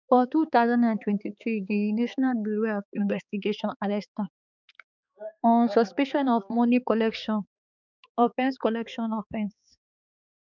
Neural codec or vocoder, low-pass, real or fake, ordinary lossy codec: codec, 16 kHz, 4 kbps, X-Codec, HuBERT features, trained on balanced general audio; 7.2 kHz; fake; none